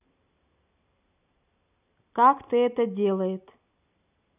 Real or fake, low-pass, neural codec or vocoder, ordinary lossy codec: real; 3.6 kHz; none; none